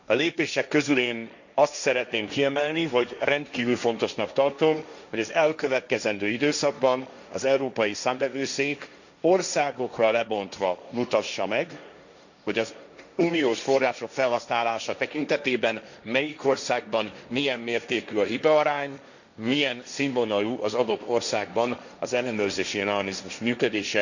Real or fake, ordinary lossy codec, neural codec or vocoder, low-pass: fake; none; codec, 16 kHz, 1.1 kbps, Voila-Tokenizer; 7.2 kHz